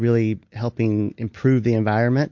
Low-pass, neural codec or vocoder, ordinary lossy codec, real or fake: 7.2 kHz; none; MP3, 48 kbps; real